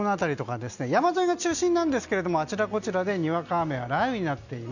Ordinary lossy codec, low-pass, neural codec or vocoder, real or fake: none; 7.2 kHz; none; real